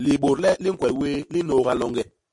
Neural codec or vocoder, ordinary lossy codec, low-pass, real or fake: none; MP3, 48 kbps; 10.8 kHz; real